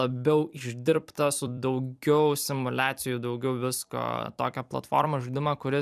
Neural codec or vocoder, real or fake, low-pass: vocoder, 44.1 kHz, 128 mel bands every 256 samples, BigVGAN v2; fake; 14.4 kHz